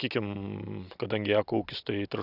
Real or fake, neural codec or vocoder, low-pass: real; none; 5.4 kHz